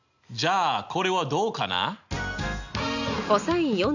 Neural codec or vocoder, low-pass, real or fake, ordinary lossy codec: none; 7.2 kHz; real; none